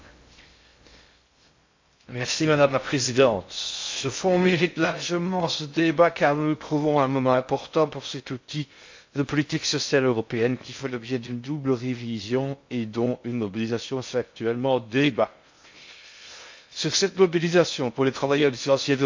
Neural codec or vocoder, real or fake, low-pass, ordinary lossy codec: codec, 16 kHz in and 24 kHz out, 0.6 kbps, FocalCodec, streaming, 2048 codes; fake; 7.2 kHz; MP3, 48 kbps